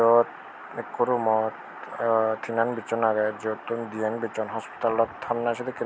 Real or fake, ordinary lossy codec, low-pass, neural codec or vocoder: real; none; none; none